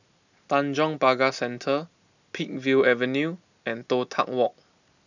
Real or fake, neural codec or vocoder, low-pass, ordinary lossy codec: real; none; 7.2 kHz; none